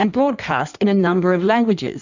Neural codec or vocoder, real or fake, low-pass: codec, 16 kHz in and 24 kHz out, 1.1 kbps, FireRedTTS-2 codec; fake; 7.2 kHz